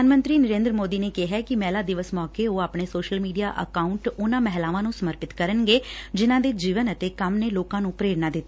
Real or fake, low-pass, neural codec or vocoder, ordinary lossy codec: real; none; none; none